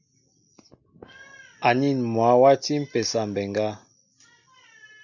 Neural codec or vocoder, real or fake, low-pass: none; real; 7.2 kHz